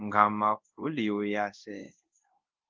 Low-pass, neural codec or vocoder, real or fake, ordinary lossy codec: 7.2 kHz; codec, 24 kHz, 0.5 kbps, DualCodec; fake; Opus, 32 kbps